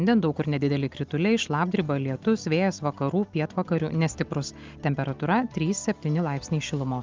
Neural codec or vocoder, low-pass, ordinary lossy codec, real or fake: none; 7.2 kHz; Opus, 32 kbps; real